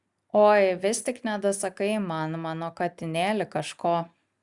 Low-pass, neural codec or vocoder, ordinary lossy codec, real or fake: 10.8 kHz; none; Opus, 64 kbps; real